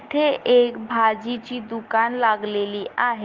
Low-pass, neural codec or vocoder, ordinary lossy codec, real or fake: 7.2 kHz; none; Opus, 32 kbps; real